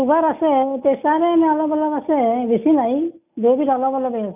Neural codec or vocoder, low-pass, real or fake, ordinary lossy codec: none; 3.6 kHz; real; none